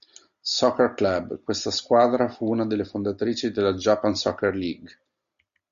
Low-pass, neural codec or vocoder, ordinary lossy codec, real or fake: 7.2 kHz; none; Opus, 64 kbps; real